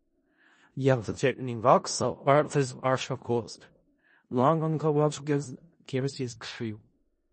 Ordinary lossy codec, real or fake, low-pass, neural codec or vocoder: MP3, 32 kbps; fake; 10.8 kHz; codec, 16 kHz in and 24 kHz out, 0.4 kbps, LongCat-Audio-Codec, four codebook decoder